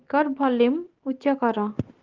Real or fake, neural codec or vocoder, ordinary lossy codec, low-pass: real; none; Opus, 16 kbps; 7.2 kHz